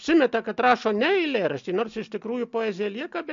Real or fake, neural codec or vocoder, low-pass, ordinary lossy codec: real; none; 7.2 kHz; MP3, 48 kbps